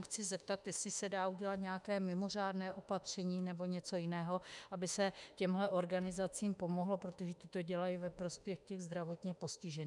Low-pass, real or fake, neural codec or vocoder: 10.8 kHz; fake; autoencoder, 48 kHz, 32 numbers a frame, DAC-VAE, trained on Japanese speech